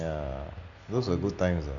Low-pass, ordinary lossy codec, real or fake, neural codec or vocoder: 7.2 kHz; none; real; none